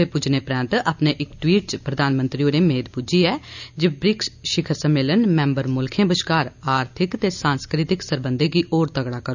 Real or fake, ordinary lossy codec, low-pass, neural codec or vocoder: real; none; 7.2 kHz; none